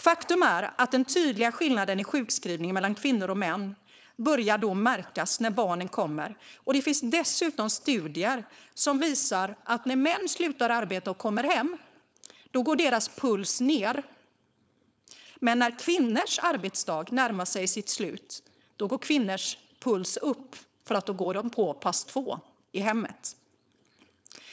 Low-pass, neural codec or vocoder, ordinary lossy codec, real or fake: none; codec, 16 kHz, 4.8 kbps, FACodec; none; fake